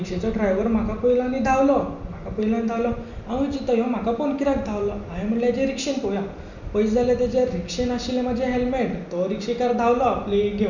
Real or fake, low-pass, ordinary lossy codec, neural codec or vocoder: real; 7.2 kHz; none; none